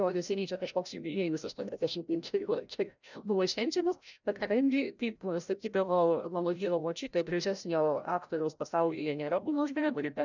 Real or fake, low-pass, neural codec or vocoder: fake; 7.2 kHz; codec, 16 kHz, 0.5 kbps, FreqCodec, larger model